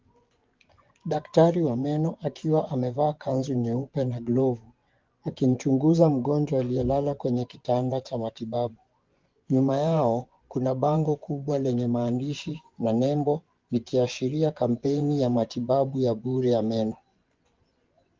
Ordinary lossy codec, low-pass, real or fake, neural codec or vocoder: Opus, 32 kbps; 7.2 kHz; fake; vocoder, 24 kHz, 100 mel bands, Vocos